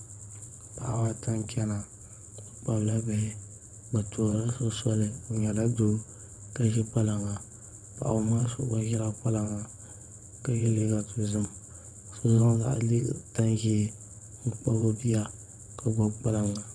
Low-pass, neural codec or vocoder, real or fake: 9.9 kHz; vocoder, 22.05 kHz, 80 mel bands, WaveNeXt; fake